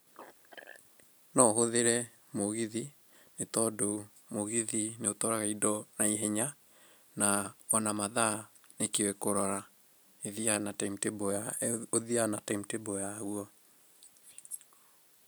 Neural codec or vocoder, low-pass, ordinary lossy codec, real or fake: none; none; none; real